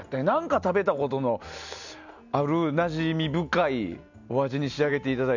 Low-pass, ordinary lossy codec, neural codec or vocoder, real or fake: 7.2 kHz; none; none; real